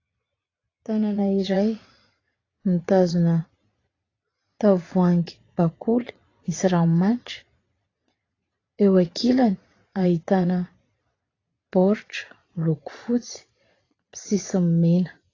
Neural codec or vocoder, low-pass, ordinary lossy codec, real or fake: vocoder, 22.05 kHz, 80 mel bands, Vocos; 7.2 kHz; AAC, 32 kbps; fake